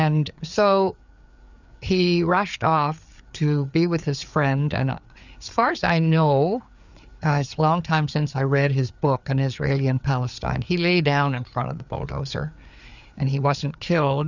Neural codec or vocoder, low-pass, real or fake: codec, 16 kHz, 4 kbps, FreqCodec, larger model; 7.2 kHz; fake